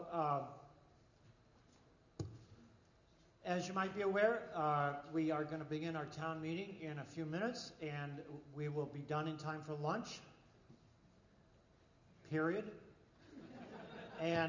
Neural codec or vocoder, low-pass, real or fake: none; 7.2 kHz; real